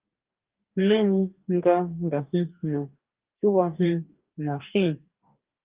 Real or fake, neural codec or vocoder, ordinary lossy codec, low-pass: fake; codec, 44.1 kHz, 2.6 kbps, DAC; Opus, 32 kbps; 3.6 kHz